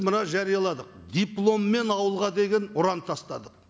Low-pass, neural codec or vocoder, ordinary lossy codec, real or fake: none; none; none; real